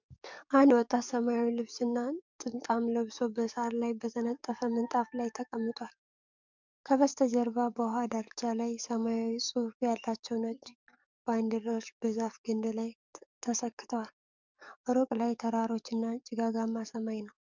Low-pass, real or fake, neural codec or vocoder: 7.2 kHz; fake; codec, 44.1 kHz, 7.8 kbps, DAC